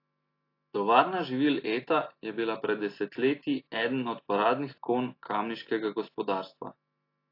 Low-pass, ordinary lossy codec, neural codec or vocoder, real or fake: 5.4 kHz; AAC, 32 kbps; none; real